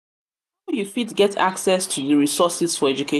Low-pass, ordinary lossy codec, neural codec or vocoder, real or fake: 14.4 kHz; none; none; real